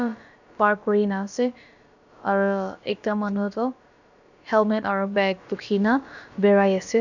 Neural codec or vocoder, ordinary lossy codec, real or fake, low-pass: codec, 16 kHz, about 1 kbps, DyCAST, with the encoder's durations; none; fake; 7.2 kHz